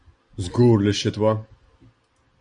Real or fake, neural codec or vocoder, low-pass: real; none; 9.9 kHz